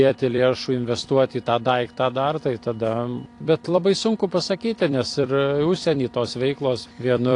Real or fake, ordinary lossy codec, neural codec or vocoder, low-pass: fake; AAC, 48 kbps; vocoder, 44.1 kHz, 128 mel bands every 256 samples, BigVGAN v2; 10.8 kHz